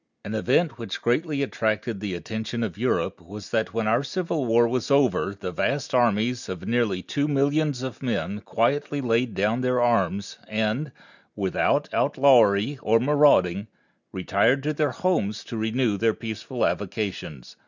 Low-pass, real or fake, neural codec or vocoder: 7.2 kHz; real; none